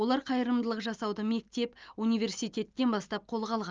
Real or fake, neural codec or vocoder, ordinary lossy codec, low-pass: real; none; Opus, 24 kbps; 7.2 kHz